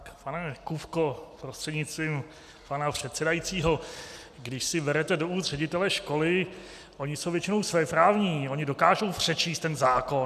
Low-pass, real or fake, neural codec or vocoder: 14.4 kHz; real; none